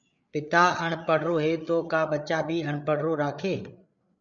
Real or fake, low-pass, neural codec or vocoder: fake; 7.2 kHz; codec, 16 kHz, 8 kbps, FreqCodec, larger model